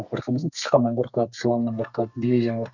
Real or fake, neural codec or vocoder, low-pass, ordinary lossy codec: fake; codec, 32 kHz, 1.9 kbps, SNAC; 7.2 kHz; none